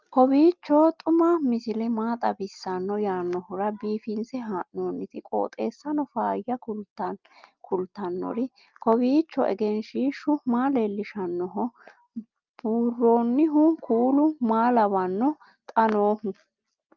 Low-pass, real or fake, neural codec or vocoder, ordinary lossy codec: 7.2 kHz; real; none; Opus, 24 kbps